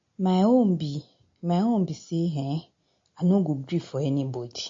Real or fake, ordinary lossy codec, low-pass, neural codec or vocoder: real; MP3, 32 kbps; 7.2 kHz; none